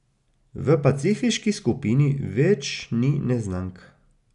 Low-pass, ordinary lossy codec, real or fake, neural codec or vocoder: 10.8 kHz; none; real; none